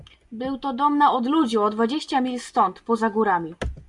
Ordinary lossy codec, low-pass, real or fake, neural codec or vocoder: MP3, 64 kbps; 10.8 kHz; real; none